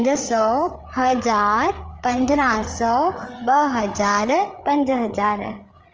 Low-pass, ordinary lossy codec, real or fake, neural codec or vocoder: 7.2 kHz; Opus, 24 kbps; fake; codec, 16 kHz, 8 kbps, FreqCodec, larger model